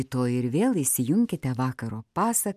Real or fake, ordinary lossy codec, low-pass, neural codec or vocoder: real; MP3, 96 kbps; 14.4 kHz; none